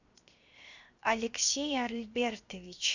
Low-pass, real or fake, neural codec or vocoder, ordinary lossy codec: 7.2 kHz; fake; codec, 16 kHz, 0.7 kbps, FocalCodec; Opus, 64 kbps